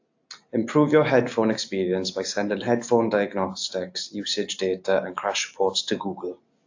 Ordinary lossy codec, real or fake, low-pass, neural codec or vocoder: AAC, 48 kbps; real; 7.2 kHz; none